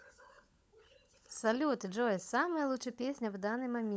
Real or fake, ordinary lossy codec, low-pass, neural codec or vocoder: fake; none; none; codec, 16 kHz, 16 kbps, FunCodec, trained on LibriTTS, 50 frames a second